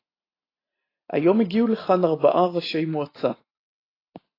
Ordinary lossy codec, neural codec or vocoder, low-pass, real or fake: AAC, 24 kbps; none; 5.4 kHz; real